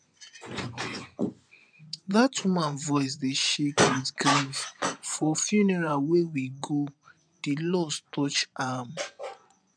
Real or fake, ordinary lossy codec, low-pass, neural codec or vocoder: fake; none; 9.9 kHz; vocoder, 24 kHz, 100 mel bands, Vocos